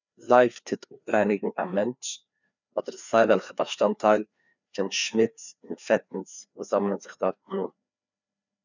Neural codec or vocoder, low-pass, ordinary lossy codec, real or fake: codec, 16 kHz, 2 kbps, FreqCodec, larger model; 7.2 kHz; none; fake